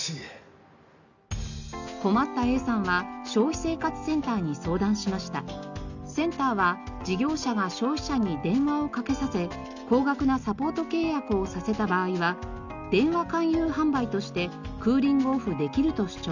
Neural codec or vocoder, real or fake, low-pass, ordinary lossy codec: none; real; 7.2 kHz; none